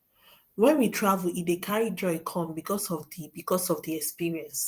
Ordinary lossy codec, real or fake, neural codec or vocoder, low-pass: Opus, 32 kbps; fake; vocoder, 44.1 kHz, 128 mel bands every 256 samples, BigVGAN v2; 14.4 kHz